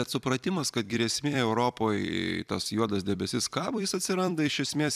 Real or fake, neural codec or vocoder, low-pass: fake; vocoder, 44.1 kHz, 128 mel bands every 256 samples, BigVGAN v2; 14.4 kHz